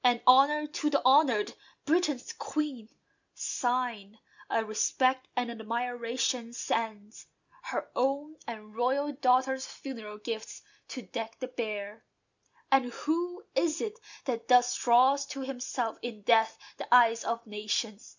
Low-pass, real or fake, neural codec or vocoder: 7.2 kHz; real; none